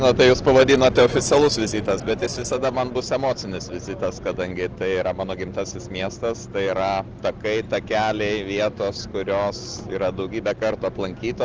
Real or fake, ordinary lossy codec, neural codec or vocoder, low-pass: real; Opus, 16 kbps; none; 7.2 kHz